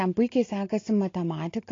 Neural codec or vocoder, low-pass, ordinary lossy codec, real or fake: none; 7.2 kHz; AAC, 32 kbps; real